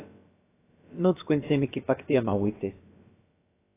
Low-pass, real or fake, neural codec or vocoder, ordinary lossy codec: 3.6 kHz; fake; codec, 16 kHz, about 1 kbps, DyCAST, with the encoder's durations; AAC, 24 kbps